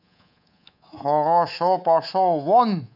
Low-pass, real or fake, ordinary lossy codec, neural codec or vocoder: 5.4 kHz; fake; none; codec, 24 kHz, 3.1 kbps, DualCodec